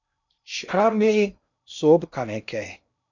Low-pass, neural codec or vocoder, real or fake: 7.2 kHz; codec, 16 kHz in and 24 kHz out, 0.6 kbps, FocalCodec, streaming, 2048 codes; fake